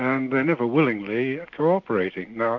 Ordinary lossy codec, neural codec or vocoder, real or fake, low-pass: AAC, 48 kbps; none; real; 7.2 kHz